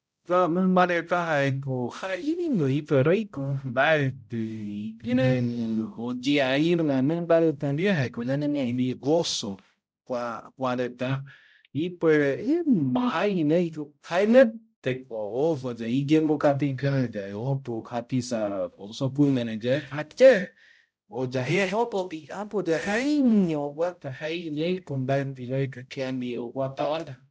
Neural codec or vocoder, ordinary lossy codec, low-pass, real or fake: codec, 16 kHz, 0.5 kbps, X-Codec, HuBERT features, trained on balanced general audio; none; none; fake